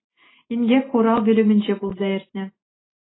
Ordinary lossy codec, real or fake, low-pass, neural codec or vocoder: AAC, 16 kbps; real; 7.2 kHz; none